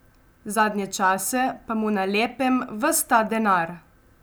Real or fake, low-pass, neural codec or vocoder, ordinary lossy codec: real; none; none; none